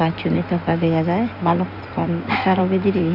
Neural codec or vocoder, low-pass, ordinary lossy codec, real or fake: codec, 16 kHz in and 24 kHz out, 2.2 kbps, FireRedTTS-2 codec; 5.4 kHz; none; fake